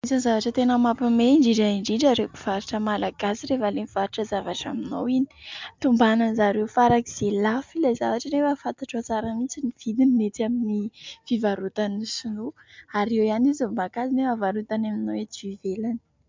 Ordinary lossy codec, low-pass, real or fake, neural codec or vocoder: MP3, 64 kbps; 7.2 kHz; real; none